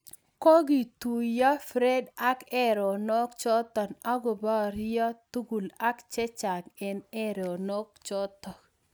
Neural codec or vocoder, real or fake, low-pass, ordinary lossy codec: none; real; none; none